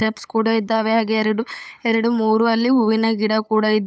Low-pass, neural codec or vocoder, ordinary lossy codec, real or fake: none; codec, 16 kHz, 8 kbps, FunCodec, trained on LibriTTS, 25 frames a second; none; fake